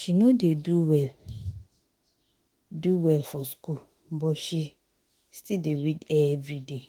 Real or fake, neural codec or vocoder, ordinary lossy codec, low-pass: fake; autoencoder, 48 kHz, 32 numbers a frame, DAC-VAE, trained on Japanese speech; Opus, 24 kbps; 19.8 kHz